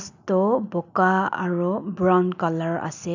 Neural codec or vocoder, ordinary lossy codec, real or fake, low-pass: none; none; real; 7.2 kHz